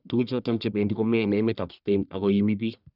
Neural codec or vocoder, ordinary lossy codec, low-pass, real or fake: codec, 44.1 kHz, 1.7 kbps, Pupu-Codec; none; 5.4 kHz; fake